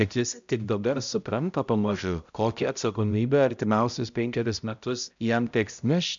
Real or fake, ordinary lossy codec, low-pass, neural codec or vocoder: fake; MP3, 64 kbps; 7.2 kHz; codec, 16 kHz, 0.5 kbps, X-Codec, HuBERT features, trained on balanced general audio